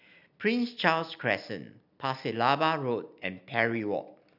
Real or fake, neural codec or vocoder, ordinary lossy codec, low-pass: real; none; none; 5.4 kHz